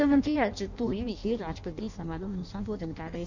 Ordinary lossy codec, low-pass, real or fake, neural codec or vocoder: none; 7.2 kHz; fake; codec, 16 kHz in and 24 kHz out, 0.6 kbps, FireRedTTS-2 codec